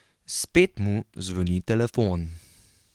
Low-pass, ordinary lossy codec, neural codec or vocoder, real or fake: 19.8 kHz; Opus, 24 kbps; codec, 44.1 kHz, 7.8 kbps, DAC; fake